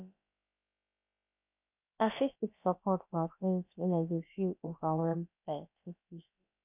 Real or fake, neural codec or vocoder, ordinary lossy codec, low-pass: fake; codec, 16 kHz, about 1 kbps, DyCAST, with the encoder's durations; none; 3.6 kHz